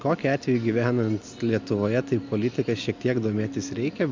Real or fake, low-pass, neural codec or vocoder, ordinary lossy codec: real; 7.2 kHz; none; MP3, 64 kbps